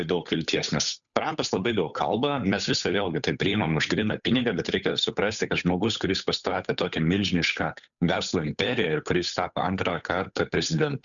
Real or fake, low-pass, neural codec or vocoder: fake; 7.2 kHz; codec, 16 kHz, 8 kbps, FunCodec, trained on Chinese and English, 25 frames a second